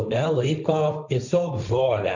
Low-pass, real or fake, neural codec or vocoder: 7.2 kHz; fake; codec, 16 kHz, 1.1 kbps, Voila-Tokenizer